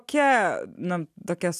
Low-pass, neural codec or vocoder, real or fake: 14.4 kHz; none; real